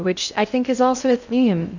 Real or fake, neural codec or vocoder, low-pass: fake; codec, 16 kHz in and 24 kHz out, 0.6 kbps, FocalCodec, streaming, 2048 codes; 7.2 kHz